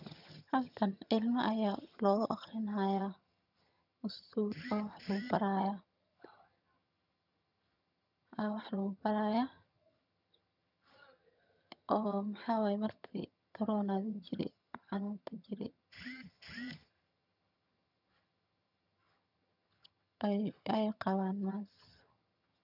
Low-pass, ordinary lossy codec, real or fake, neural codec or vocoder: 5.4 kHz; none; fake; vocoder, 22.05 kHz, 80 mel bands, HiFi-GAN